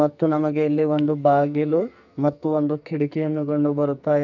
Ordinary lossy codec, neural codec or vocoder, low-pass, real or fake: none; codec, 44.1 kHz, 2.6 kbps, SNAC; 7.2 kHz; fake